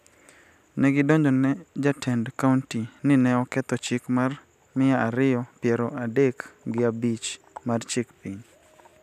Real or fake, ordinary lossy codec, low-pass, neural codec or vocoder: real; none; 14.4 kHz; none